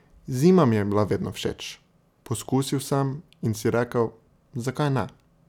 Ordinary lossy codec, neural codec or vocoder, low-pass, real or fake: none; none; 19.8 kHz; real